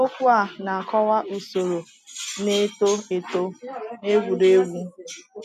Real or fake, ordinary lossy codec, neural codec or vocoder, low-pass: real; none; none; 14.4 kHz